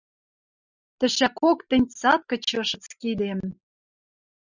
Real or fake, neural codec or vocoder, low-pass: real; none; 7.2 kHz